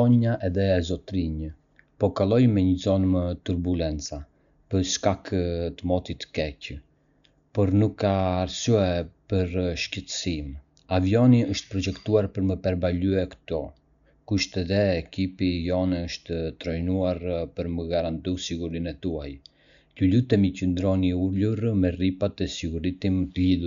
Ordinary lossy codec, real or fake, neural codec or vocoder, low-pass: MP3, 96 kbps; real; none; 7.2 kHz